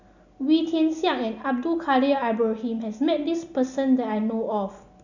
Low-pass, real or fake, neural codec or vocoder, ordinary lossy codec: 7.2 kHz; real; none; none